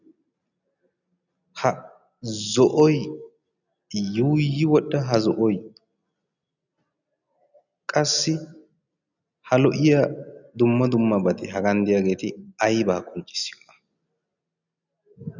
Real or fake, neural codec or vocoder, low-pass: real; none; 7.2 kHz